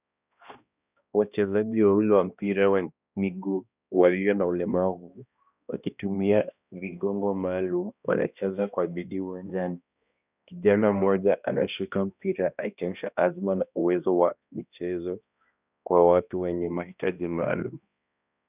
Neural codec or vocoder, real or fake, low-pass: codec, 16 kHz, 1 kbps, X-Codec, HuBERT features, trained on balanced general audio; fake; 3.6 kHz